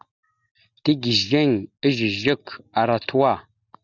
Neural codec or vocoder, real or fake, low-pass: none; real; 7.2 kHz